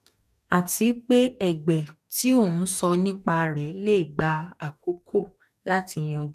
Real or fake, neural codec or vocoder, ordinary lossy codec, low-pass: fake; codec, 44.1 kHz, 2.6 kbps, DAC; none; 14.4 kHz